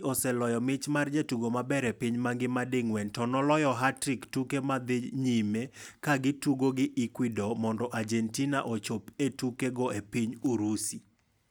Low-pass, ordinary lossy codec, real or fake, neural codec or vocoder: none; none; real; none